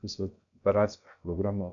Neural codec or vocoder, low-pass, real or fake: codec, 16 kHz, about 1 kbps, DyCAST, with the encoder's durations; 7.2 kHz; fake